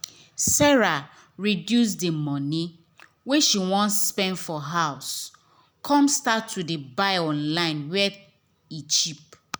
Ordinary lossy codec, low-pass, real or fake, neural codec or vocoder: none; none; real; none